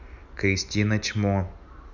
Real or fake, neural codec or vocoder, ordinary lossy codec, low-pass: real; none; none; 7.2 kHz